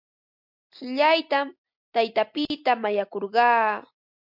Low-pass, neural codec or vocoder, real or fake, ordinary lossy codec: 5.4 kHz; none; real; MP3, 48 kbps